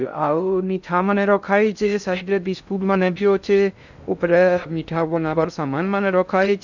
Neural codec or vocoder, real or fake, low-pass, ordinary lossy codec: codec, 16 kHz in and 24 kHz out, 0.6 kbps, FocalCodec, streaming, 2048 codes; fake; 7.2 kHz; none